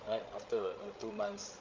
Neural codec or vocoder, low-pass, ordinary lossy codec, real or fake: codec, 16 kHz, 16 kbps, FreqCodec, larger model; 7.2 kHz; Opus, 24 kbps; fake